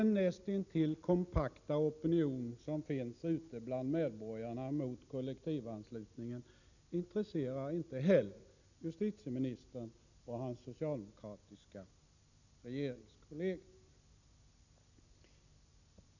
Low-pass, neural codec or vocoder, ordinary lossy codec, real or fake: 7.2 kHz; none; none; real